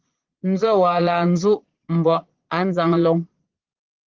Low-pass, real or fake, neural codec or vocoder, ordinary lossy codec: 7.2 kHz; fake; vocoder, 22.05 kHz, 80 mel bands, Vocos; Opus, 16 kbps